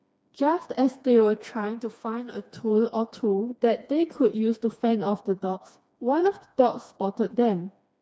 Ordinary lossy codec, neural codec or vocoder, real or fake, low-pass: none; codec, 16 kHz, 2 kbps, FreqCodec, smaller model; fake; none